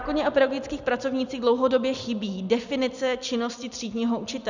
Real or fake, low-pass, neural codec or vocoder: real; 7.2 kHz; none